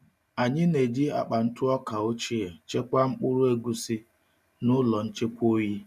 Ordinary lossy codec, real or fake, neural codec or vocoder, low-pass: none; real; none; 14.4 kHz